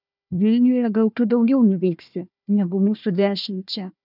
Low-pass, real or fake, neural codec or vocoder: 5.4 kHz; fake; codec, 16 kHz, 1 kbps, FunCodec, trained on Chinese and English, 50 frames a second